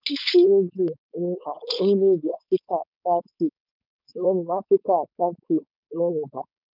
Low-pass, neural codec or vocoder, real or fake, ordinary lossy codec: 5.4 kHz; codec, 16 kHz, 4.8 kbps, FACodec; fake; none